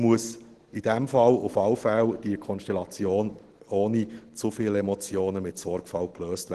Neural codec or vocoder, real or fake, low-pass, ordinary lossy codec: none; real; 10.8 kHz; Opus, 16 kbps